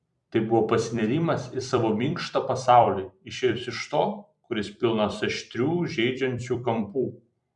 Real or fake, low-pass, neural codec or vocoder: fake; 10.8 kHz; vocoder, 44.1 kHz, 128 mel bands every 256 samples, BigVGAN v2